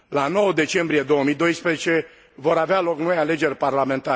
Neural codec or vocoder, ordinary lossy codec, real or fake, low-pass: none; none; real; none